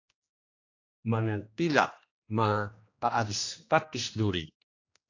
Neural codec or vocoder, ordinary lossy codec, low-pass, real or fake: codec, 16 kHz, 1 kbps, X-Codec, HuBERT features, trained on general audio; AAC, 48 kbps; 7.2 kHz; fake